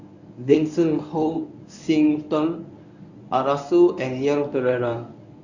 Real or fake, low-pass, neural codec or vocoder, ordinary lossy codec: fake; 7.2 kHz; codec, 24 kHz, 0.9 kbps, WavTokenizer, medium speech release version 1; none